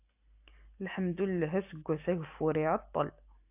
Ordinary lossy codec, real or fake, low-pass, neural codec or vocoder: AAC, 32 kbps; real; 3.6 kHz; none